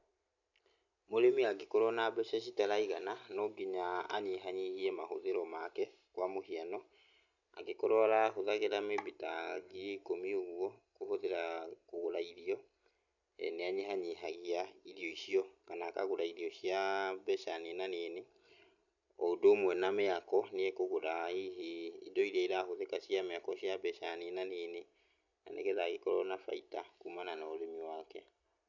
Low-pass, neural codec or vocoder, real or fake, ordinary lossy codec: 7.2 kHz; none; real; none